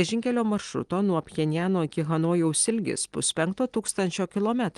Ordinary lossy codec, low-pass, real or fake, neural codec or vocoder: Opus, 24 kbps; 10.8 kHz; real; none